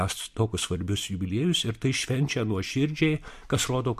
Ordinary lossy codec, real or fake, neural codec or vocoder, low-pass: MP3, 64 kbps; real; none; 14.4 kHz